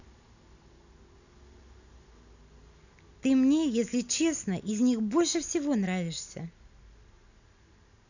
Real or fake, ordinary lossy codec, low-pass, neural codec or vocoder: real; none; 7.2 kHz; none